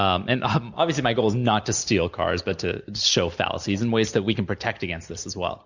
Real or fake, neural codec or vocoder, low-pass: real; none; 7.2 kHz